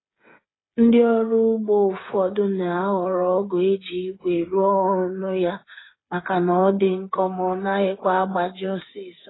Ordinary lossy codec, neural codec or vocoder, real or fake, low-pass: AAC, 16 kbps; codec, 16 kHz, 8 kbps, FreqCodec, smaller model; fake; 7.2 kHz